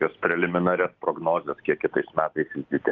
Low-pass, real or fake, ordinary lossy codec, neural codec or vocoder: 7.2 kHz; real; Opus, 32 kbps; none